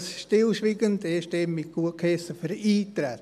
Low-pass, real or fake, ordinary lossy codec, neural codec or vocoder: 14.4 kHz; real; MP3, 96 kbps; none